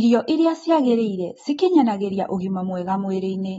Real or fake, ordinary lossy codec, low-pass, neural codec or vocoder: real; AAC, 24 kbps; 19.8 kHz; none